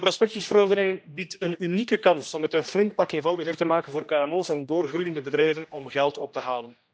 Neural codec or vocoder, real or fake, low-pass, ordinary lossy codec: codec, 16 kHz, 1 kbps, X-Codec, HuBERT features, trained on general audio; fake; none; none